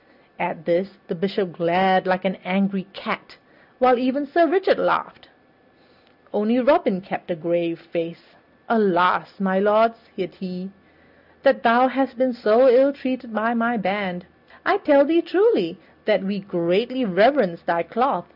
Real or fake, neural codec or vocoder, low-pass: real; none; 5.4 kHz